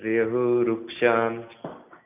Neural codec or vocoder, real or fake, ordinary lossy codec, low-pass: none; real; none; 3.6 kHz